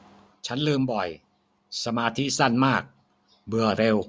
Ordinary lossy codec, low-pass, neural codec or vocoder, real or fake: none; none; none; real